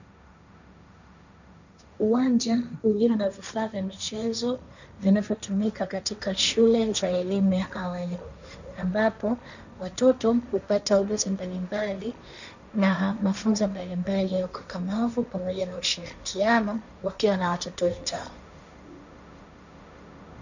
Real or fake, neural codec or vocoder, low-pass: fake; codec, 16 kHz, 1.1 kbps, Voila-Tokenizer; 7.2 kHz